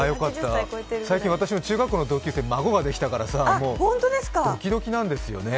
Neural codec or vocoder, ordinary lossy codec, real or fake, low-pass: none; none; real; none